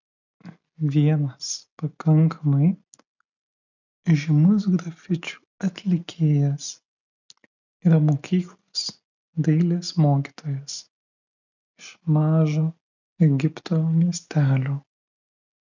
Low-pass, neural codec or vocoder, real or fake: 7.2 kHz; none; real